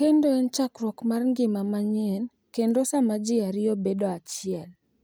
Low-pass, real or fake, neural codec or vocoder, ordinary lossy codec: none; real; none; none